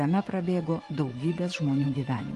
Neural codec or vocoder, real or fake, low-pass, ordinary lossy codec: vocoder, 24 kHz, 100 mel bands, Vocos; fake; 10.8 kHz; Opus, 24 kbps